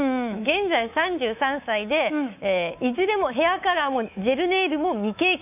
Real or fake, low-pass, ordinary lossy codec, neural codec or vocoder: fake; 3.6 kHz; none; codec, 24 kHz, 3.1 kbps, DualCodec